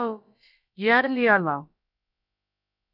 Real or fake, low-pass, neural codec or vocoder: fake; 5.4 kHz; codec, 16 kHz, about 1 kbps, DyCAST, with the encoder's durations